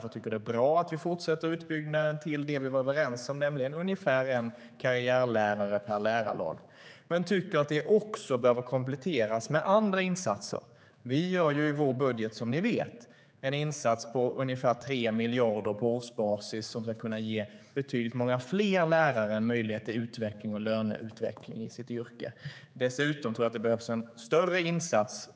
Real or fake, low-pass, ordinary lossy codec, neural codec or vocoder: fake; none; none; codec, 16 kHz, 4 kbps, X-Codec, HuBERT features, trained on general audio